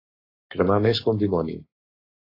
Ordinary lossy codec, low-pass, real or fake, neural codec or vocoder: AAC, 24 kbps; 5.4 kHz; fake; codec, 44.1 kHz, 7.8 kbps, Pupu-Codec